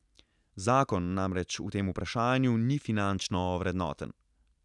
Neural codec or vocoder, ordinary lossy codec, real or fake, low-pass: none; none; real; 10.8 kHz